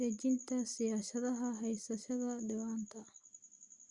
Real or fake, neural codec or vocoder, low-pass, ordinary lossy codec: real; none; 10.8 kHz; Opus, 64 kbps